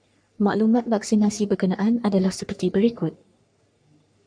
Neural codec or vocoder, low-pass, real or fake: codec, 44.1 kHz, 3.4 kbps, Pupu-Codec; 9.9 kHz; fake